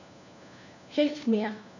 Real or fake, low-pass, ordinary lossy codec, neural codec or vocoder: fake; 7.2 kHz; none; codec, 16 kHz, 1 kbps, FunCodec, trained on LibriTTS, 50 frames a second